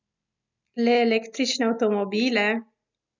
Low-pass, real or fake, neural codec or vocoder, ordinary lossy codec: 7.2 kHz; real; none; none